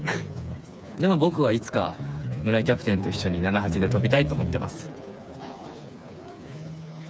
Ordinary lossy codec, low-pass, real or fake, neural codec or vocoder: none; none; fake; codec, 16 kHz, 4 kbps, FreqCodec, smaller model